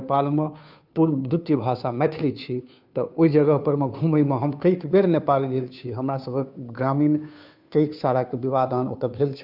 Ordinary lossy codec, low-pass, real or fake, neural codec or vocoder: none; 5.4 kHz; fake; codec, 16 kHz, 2 kbps, FunCodec, trained on Chinese and English, 25 frames a second